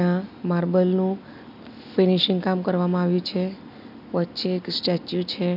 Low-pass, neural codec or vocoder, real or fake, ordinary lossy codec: 5.4 kHz; none; real; none